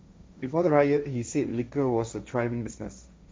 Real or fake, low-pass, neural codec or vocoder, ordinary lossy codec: fake; none; codec, 16 kHz, 1.1 kbps, Voila-Tokenizer; none